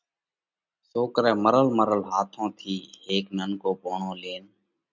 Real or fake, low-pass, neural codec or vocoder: real; 7.2 kHz; none